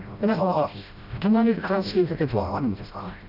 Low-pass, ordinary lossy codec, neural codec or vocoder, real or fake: 5.4 kHz; none; codec, 16 kHz, 0.5 kbps, FreqCodec, smaller model; fake